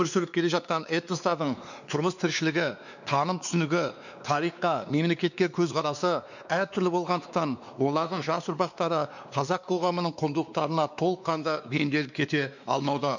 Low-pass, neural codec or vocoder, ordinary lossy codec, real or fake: 7.2 kHz; codec, 16 kHz, 2 kbps, X-Codec, WavLM features, trained on Multilingual LibriSpeech; none; fake